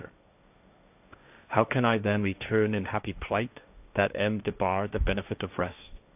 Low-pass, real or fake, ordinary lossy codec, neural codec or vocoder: 3.6 kHz; fake; AAC, 32 kbps; codec, 16 kHz, 1.1 kbps, Voila-Tokenizer